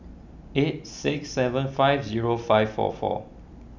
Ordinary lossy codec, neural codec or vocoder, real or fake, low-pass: none; none; real; 7.2 kHz